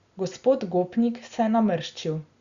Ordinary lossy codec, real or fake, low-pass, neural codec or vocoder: Opus, 64 kbps; real; 7.2 kHz; none